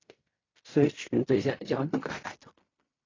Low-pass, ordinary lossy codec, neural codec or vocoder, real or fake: 7.2 kHz; AAC, 32 kbps; codec, 16 kHz in and 24 kHz out, 0.4 kbps, LongCat-Audio-Codec, fine tuned four codebook decoder; fake